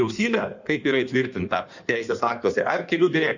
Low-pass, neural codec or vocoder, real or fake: 7.2 kHz; codec, 16 kHz in and 24 kHz out, 1.1 kbps, FireRedTTS-2 codec; fake